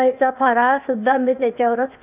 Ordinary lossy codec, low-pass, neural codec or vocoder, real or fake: none; 3.6 kHz; codec, 16 kHz, 0.8 kbps, ZipCodec; fake